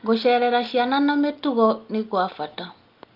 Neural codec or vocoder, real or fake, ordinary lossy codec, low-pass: none; real; Opus, 32 kbps; 5.4 kHz